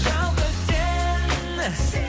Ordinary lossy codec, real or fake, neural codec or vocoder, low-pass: none; real; none; none